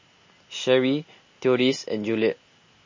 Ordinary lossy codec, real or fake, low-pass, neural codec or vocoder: MP3, 32 kbps; real; 7.2 kHz; none